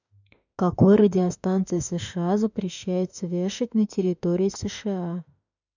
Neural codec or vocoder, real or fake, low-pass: autoencoder, 48 kHz, 32 numbers a frame, DAC-VAE, trained on Japanese speech; fake; 7.2 kHz